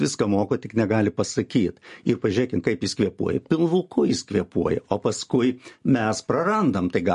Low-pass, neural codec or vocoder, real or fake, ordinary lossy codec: 10.8 kHz; none; real; MP3, 48 kbps